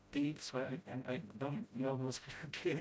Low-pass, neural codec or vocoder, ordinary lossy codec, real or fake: none; codec, 16 kHz, 0.5 kbps, FreqCodec, smaller model; none; fake